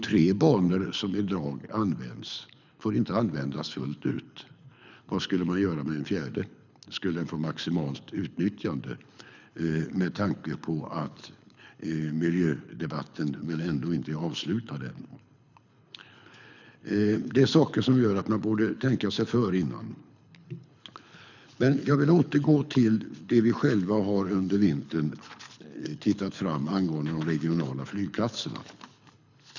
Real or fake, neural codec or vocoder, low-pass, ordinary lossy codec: fake; codec, 24 kHz, 6 kbps, HILCodec; 7.2 kHz; none